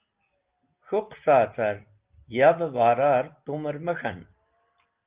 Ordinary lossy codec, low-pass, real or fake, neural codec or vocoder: Opus, 32 kbps; 3.6 kHz; real; none